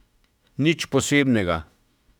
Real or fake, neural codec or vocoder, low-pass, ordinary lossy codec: fake; autoencoder, 48 kHz, 32 numbers a frame, DAC-VAE, trained on Japanese speech; 19.8 kHz; none